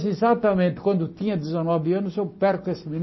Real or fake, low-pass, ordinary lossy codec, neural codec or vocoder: real; 7.2 kHz; MP3, 24 kbps; none